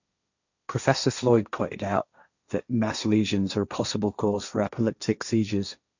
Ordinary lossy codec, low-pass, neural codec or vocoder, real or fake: none; 7.2 kHz; codec, 16 kHz, 1.1 kbps, Voila-Tokenizer; fake